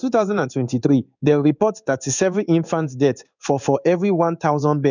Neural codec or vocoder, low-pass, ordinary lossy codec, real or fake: codec, 16 kHz in and 24 kHz out, 1 kbps, XY-Tokenizer; 7.2 kHz; none; fake